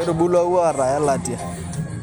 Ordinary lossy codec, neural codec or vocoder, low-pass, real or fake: none; none; none; real